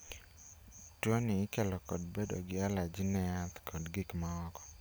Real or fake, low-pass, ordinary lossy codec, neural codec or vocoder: real; none; none; none